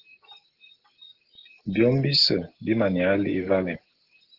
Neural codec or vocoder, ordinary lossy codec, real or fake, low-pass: none; Opus, 16 kbps; real; 5.4 kHz